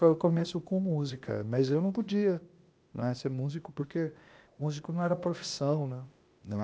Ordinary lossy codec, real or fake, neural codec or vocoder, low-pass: none; fake; codec, 16 kHz, 0.8 kbps, ZipCodec; none